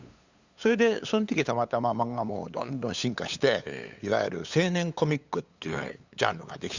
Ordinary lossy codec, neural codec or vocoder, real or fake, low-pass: Opus, 64 kbps; codec, 16 kHz, 8 kbps, FunCodec, trained on LibriTTS, 25 frames a second; fake; 7.2 kHz